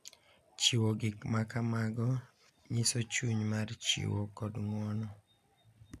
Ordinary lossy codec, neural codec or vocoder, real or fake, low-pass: none; none; real; 14.4 kHz